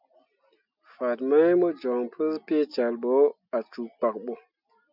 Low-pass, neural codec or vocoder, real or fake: 5.4 kHz; none; real